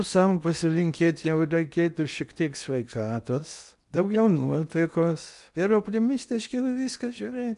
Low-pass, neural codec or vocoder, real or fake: 10.8 kHz; codec, 16 kHz in and 24 kHz out, 0.8 kbps, FocalCodec, streaming, 65536 codes; fake